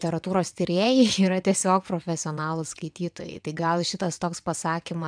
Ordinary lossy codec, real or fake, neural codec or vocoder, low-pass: MP3, 96 kbps; fake; vocoder, 22.05 kHz, 80 mel bands, WaveNeXt; 9.9 kHz